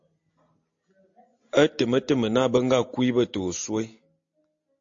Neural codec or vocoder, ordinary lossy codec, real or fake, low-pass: none; AAC, 48 kbps; real; 7.2 kHz